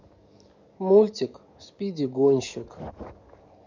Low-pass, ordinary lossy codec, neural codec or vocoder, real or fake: 7.2 kHz; none; codec, 44.1 kHz, 7.8 kbps, DAC; fake